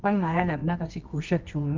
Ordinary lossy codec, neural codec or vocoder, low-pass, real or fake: Opus, 24 kbps; codec, 24 kHz, 0.9 kbps, WavTokenizer, medium music audio release; 7.2 kHz; fake